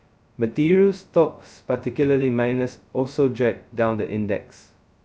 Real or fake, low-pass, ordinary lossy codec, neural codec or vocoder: fake; none; none; codec, 16 kHz, 0.2 kbps, FocalCodec